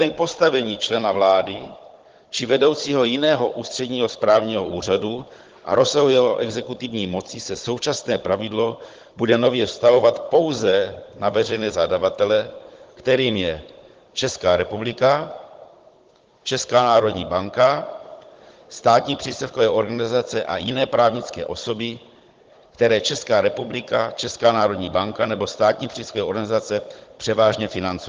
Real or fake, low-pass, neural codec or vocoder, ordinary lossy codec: fake; 7.2 kHz; codec, 16 kHz, 16 kbps, FunCodec, trained on Chinese and English, 50 frames a second; Opus, 16 kbps